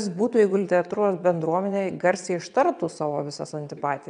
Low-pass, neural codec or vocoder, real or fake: 9.9 kHz; vocoder, 22.05 kHz, 80 mel bands, WaveNeXt; fake